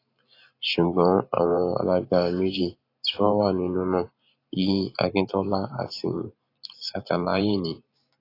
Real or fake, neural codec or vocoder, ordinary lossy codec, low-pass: fake; vocoder, 44.1 kHz, 128 mel bands every 512 samples, BigVGAN v2; AAC, 32 kbps; 5.4 kHz